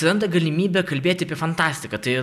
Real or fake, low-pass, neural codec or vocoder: real; 14.4 kHz; none